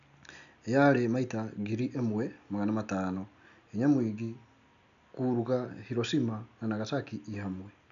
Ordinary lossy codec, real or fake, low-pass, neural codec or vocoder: none; real; 7.2 kHz; none